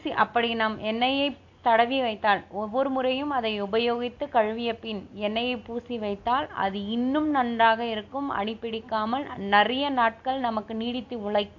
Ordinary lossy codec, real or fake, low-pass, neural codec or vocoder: AAC, 48 kbps; real; 7.2 kHz; none